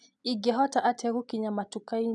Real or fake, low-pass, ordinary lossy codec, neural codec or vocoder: real; 10.8 kHz; none; none